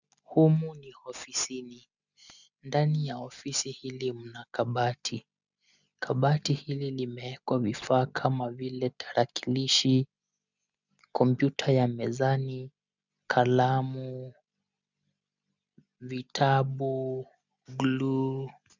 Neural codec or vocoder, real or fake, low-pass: none; real; 7.2 kHz